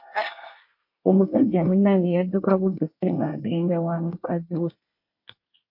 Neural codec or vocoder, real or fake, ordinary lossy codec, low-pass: codec, 24 kHz, 1 kbps, SNAC; fake; MP3, 32 kbps; 5.4 kHz